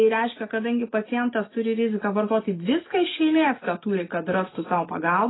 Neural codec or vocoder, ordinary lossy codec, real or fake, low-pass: none; AAC, 16 kbps; real; 7.2 kHz